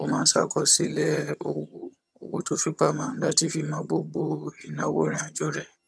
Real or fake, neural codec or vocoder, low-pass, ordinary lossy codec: fake; vocoder, 22.05 kHz, 80 mel bands, HiFi-GAN; none; none